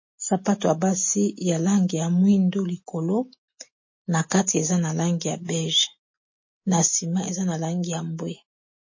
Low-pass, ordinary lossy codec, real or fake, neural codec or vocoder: 7.2 kHz; MP3, 32 kbps; real; none